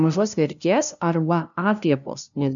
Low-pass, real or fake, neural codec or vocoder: 7.2 kHz; fake; codec, 16 kHz, 0.5 kbps, FunCodec, trained on LibriTTS, 25 frames a second